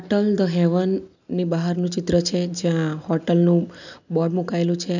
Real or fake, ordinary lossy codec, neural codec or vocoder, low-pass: real; none; none; 7.2 kHz